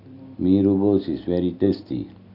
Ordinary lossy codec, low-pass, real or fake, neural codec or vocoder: none; 5.4 kHz; real; none